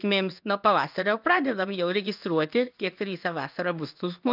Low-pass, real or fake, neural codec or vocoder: 5.4 kHz; fake; codec, 16 kHz in and 24 kHz out, 1 kbps, XY-Tokenizer